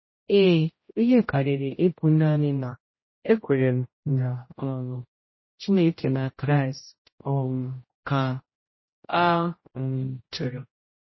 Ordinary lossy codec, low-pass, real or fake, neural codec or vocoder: MP3, 24 kbps; 7.2 kHz; fake; codec, 16 kHz, 0.5 kbps, X-Codec, HuBERT features, trained on general audio